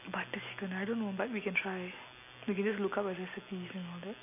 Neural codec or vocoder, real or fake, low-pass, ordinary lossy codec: none; real; 3.6 kHz; none